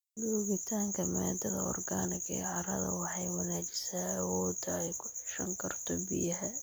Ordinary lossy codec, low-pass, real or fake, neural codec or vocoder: none; none; real; none